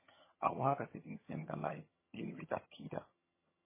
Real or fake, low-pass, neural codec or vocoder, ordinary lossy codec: fake; 3.6 kHz; vocoder, 22.05 kHz, 80 mel bands, HiFi-GAN; MP3, 16 kbps